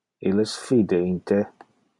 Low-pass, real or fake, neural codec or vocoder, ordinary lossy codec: 10.8 kHz; real; none; MP3, 64 kbps